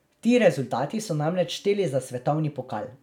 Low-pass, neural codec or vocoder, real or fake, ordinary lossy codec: 19.8 kHz; none; real; none